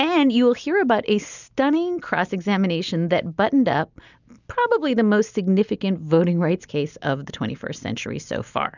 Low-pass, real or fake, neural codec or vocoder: 7.2 kHz; real; none